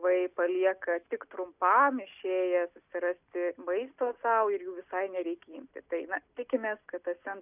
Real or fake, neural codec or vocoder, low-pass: real; none; 3.6 kHz